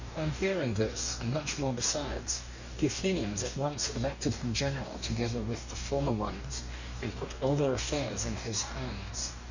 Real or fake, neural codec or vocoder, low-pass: fake; codec, 44.1 kHz, 2.6 kbps, DAC; 7.2 kHz